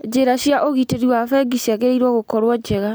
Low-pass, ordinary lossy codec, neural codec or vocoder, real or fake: none; none; none; real